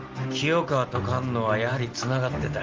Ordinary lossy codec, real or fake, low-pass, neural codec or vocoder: Opus, 16 kbps; real; 7.2 kHz; none